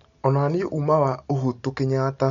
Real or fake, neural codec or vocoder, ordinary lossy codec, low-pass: real; none; none; 7.2 kHz